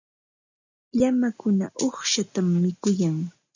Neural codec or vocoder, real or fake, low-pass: none; real; 7.2 kHz